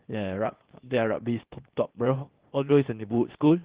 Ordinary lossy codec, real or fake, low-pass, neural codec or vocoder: Opus, 16 kbps; fake; 3.6 kHz; codec, 16 kHz, 0.8 kbps, ZipCodec